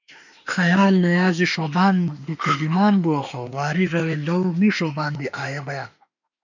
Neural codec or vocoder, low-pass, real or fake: autoencoder, 48 kHz, 32 numbers a frame, DAC-VAE, trained on Japanese speech; 7.2 kHz; fake